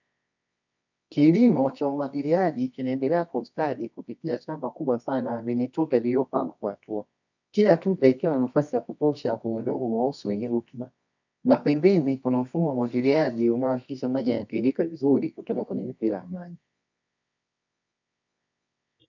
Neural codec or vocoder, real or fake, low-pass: codec, 24 kHz, 0.9 kbps, WavTokenizer, medium music audio release; fake; 7.2 kHz